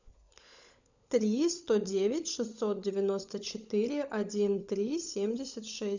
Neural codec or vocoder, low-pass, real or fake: codec, 16 kHz, 16 kbps, FunCodec, trained on LibriTTS, 50 frames a second; 7.2 kHz; fake